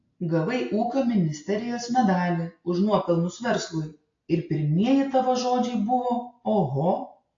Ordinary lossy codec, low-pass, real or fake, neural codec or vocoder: AAC, 48 kbps; 7.2 kHz; real; none